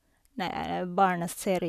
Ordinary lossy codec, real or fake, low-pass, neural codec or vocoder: none; real; 14.4 kHz; none